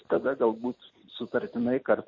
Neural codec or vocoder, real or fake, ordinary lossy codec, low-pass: none; real; MP3, 32 kbps; 7.2 kHz